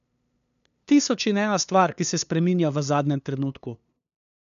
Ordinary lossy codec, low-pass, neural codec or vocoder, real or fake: none; 7.2 kHz; codec, 16 kHz, 2 kbps, FunCodec, trained on LibriTTS, 25 frames a second; fake